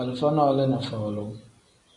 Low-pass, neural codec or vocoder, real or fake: 10.8 kHz; none; real